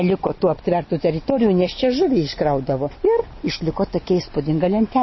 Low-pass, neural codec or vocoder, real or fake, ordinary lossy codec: 7.2 kHz; none; real; MP3, 24 kbps